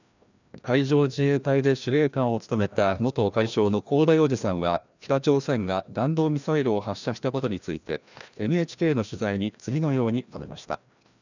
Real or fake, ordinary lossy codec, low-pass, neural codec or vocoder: fake; none; 7.2 kHz; codec, 16 kHz, 1 kbps, FreqCodec, larger model